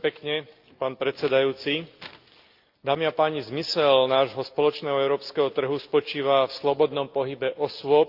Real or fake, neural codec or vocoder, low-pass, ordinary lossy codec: real; none; 5.4 kHz; Opus, 24 kbps